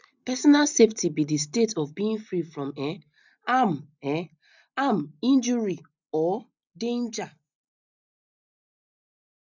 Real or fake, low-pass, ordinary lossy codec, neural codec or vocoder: real; 7.2 kHz; none; none